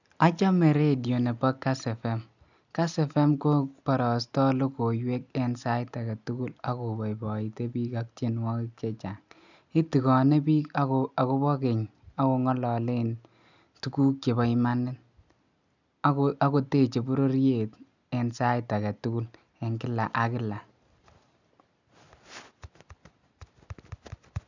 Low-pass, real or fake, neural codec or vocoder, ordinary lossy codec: 7.2 kHz; real; none; none